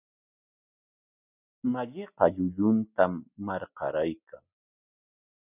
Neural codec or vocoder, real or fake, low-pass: none; real; 3.6 kHz